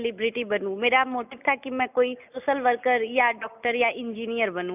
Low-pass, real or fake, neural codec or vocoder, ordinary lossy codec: 3.6 kHz; real; none; none